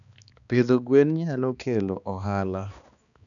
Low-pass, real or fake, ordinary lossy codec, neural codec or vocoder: 7.2 kHz; fake; none; codec, 16 kHz, 2 kbps, X-Codec, HuBERT features, trained on balanced general audio